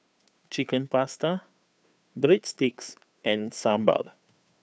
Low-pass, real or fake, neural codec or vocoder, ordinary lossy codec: none; fake; codec, 16 kHz, 2 kbps, FunCodec, trained on Chinese and English, 25 frames a second; none